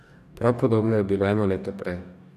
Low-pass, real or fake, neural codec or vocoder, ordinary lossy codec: 14.4 kHz; fake; codec, 44.1 kHz, 2.6 kbps, DAC; none